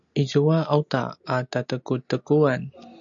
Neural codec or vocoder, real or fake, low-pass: none; real; 7.2 kHz